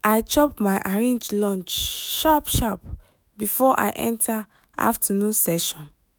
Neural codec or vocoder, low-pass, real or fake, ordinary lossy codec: autoencoder, 48 kHz, 128 numbers a frame, DAC-VAE, trained on Japanese speech; none; fake; none